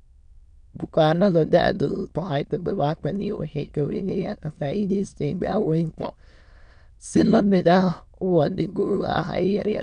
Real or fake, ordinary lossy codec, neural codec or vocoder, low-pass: fake; none; autoencoder, 22.05 kHz, a latent of 192 numbers a frame, VITS, trained on many speakers; 9.9 kHz